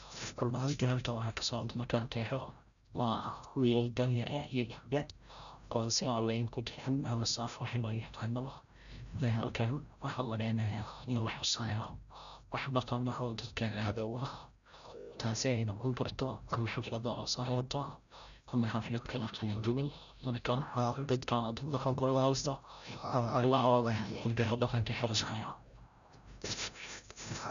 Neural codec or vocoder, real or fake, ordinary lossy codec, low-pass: codec, 16 kHz, 0.5 kbps, FreqCodec, larger model; fake; none; 7.2 kHz